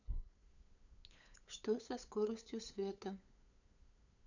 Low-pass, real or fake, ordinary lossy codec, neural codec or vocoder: 7.2 kHz; fake; none; codec, 16 kHz, 8 kbps, FunCodec, trained on LibriTTS, 25 frames a second